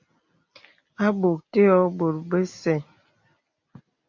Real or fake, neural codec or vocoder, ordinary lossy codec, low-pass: real; none; MP3, 48 kbps; 7.2 kHz